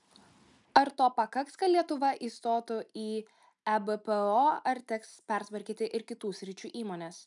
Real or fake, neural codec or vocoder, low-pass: real; none; 10.8 kHz